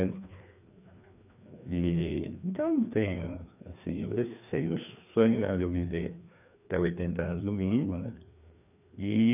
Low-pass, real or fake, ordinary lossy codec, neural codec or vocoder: 3.6 kHz; fake; none; codec, 16 kHz, 2 kbps, FreqCodec, larger model